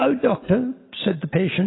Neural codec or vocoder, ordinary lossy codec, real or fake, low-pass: none; AAC, 16 kbps; real; 7.2 kHz